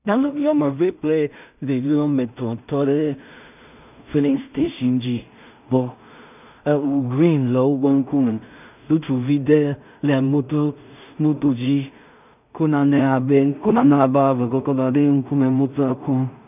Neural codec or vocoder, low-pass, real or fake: codec, 16 kHz in and 24 kHz out, 0.4 kbps, LongCat-Audio-Codec, two codebook decoder; 3.6 kHz; fake